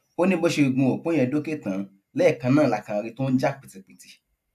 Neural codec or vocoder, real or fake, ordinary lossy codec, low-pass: none; real; AAC, 96 kbps; 14.4 kHz